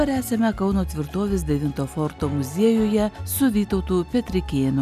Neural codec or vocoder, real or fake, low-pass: none; real; 14.4 kHz